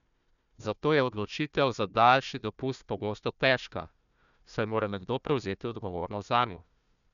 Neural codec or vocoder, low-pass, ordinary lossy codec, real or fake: codec, 16 kHz, 1 kbps, FunCodec, trained on Chinese and English, 50 frames a second; 7.2 kHz; none; fake